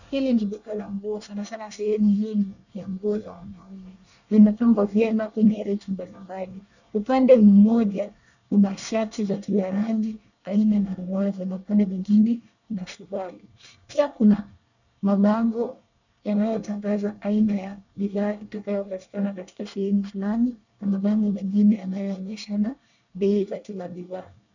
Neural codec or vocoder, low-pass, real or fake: codec, 24 kHz, 1 kbps, SNAC; 7.2 kHz; fake